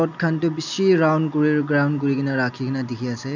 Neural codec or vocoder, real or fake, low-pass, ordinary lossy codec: none; real; 7.2 kHz; none